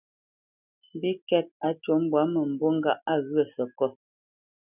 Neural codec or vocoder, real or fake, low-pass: none; real; 3.6 kHz